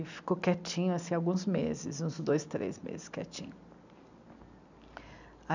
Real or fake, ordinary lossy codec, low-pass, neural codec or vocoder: real; none; 7.2 kHz; none